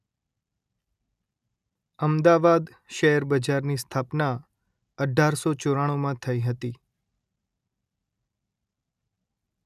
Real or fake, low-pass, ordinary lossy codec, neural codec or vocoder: real; 14.4 kHz; none; none